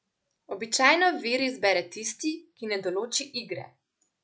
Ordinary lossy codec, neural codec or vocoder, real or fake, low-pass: none; none; real; none